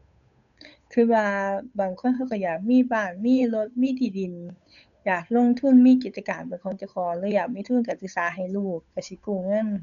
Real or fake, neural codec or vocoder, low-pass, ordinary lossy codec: fake; codec, 16 kHz, 8 kbps, FunCodec, trained on Chinese and English, 25 frames a second; 7.2 kHz; none